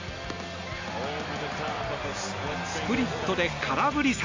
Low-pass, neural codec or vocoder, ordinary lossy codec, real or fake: 7.2 kHz; none; MP3, 64 kbps; real